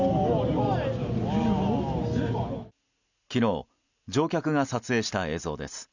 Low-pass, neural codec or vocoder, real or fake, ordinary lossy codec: 7.2 kHz; none; real; none